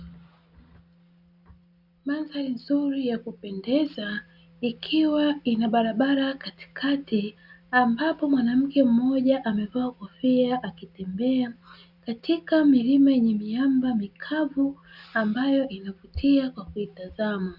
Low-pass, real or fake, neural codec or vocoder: 5.4 kHz; real; none